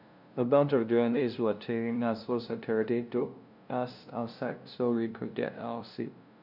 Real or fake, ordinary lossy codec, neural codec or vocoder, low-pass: fake; none; codec, 16 kHz, 0.5 kbps, FunCodec, trained on LibriTTS, 25 frames a second; 5.4 kHz